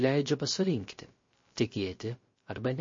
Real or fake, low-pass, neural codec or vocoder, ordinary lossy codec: fake; 7.2 kHz; codec, 16 kHz, 0.3 kbps, FocalCodec; MP3, 32 kbps